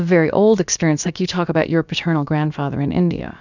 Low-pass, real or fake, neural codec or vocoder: 7.2 kHz; fake; codec, 16 kHz, about 1 kbps, DyCAST, with the encoder's durations